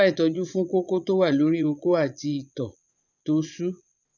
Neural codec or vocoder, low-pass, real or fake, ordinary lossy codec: vocoder, 22.05 kHz, 80 mel bands, Vocos; 7.2 kHz; fake; none